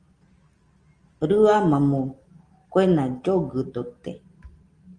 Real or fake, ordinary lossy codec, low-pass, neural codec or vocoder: real; Opus, 24 kbps; 9.9 kHz; none